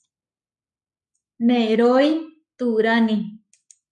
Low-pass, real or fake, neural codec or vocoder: 10.8 kHz; fake; codec, 44.1 kHz, 7.8 kbps, Pupu-Codec